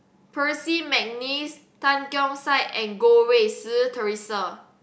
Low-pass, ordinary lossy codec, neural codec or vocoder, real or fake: none; none; none; real